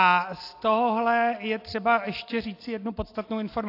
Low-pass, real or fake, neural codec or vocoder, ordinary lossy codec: 5.4 kHz; real; none; AAC, 32 kbps